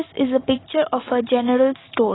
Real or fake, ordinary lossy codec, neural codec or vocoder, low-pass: real; AAC, 16 kbps; none; 7.2 kHz